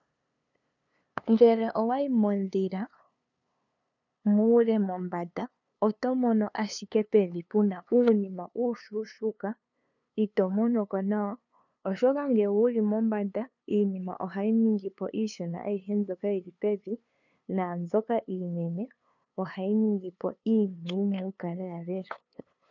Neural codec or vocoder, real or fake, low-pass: codec, 16 kHz, 2 kbps, FunCodec, trained on LibriTTS, 25 frames a second; fake; 7.2 kHz